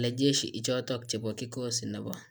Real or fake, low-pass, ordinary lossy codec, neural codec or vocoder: real; none; none; none